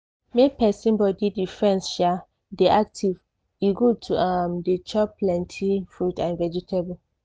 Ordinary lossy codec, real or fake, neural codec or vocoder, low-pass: none; real; none; none